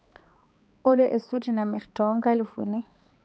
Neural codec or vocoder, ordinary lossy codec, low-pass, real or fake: codec, 16 kHz, 2 kbps, X-Codec, HuBERT features, trained on balanced general audio; none; none; fake